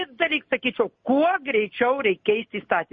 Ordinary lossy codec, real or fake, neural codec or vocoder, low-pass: MP3, 32 kbps; real; none; 7.2 kHz